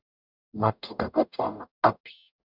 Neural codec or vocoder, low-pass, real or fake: codec, 44.1 kHz, 0.9 kbps, DAC; 5.4 kHz; fake